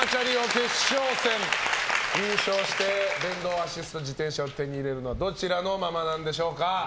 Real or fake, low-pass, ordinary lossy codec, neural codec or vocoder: real; none; none; none